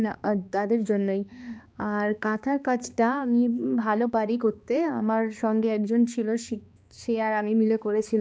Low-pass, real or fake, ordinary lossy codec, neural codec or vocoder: none; fake; none; codec, 16 kHz, 2 kbps, X-Codec, HuBERT features, trained on balanced general audio